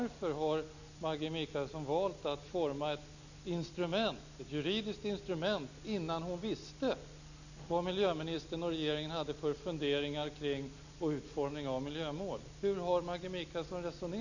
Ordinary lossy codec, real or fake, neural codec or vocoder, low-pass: none; real; none; 7.2 kHz